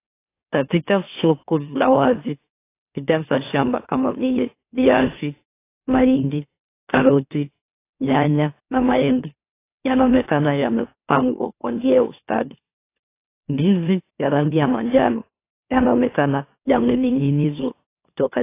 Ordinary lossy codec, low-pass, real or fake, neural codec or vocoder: AAC, 24 kbps; 3.6 kHz; fake; autoencoder, 44.1 kHz, a latent of 192 numbers a frame, MeloTTS